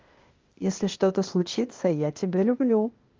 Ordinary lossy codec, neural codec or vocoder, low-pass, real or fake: Opus, 32 kbps; codec, 16 kHz, 0.8 kbps, ZipCodec; 7.2 kHz; fake